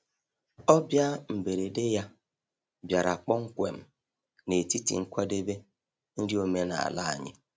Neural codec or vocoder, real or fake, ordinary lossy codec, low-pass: none; real; none; none